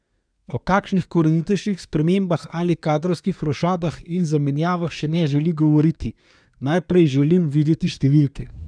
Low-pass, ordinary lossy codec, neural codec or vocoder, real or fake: 9.9 kHz; none; codec, 24 kHz, 1 kbps, SNAC; fake